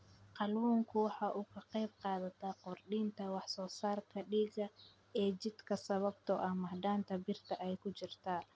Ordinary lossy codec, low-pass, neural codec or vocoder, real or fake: none; none; none; real